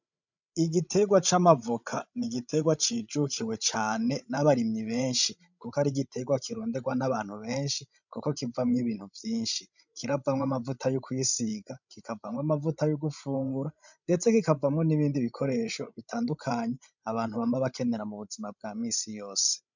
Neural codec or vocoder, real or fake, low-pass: codec, 16 kHz, 16 kbps, FreqCodec, larger model; fake; 7.2 kHz